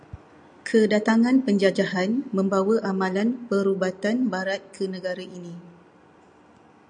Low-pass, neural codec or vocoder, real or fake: 9.9 kHz; none; real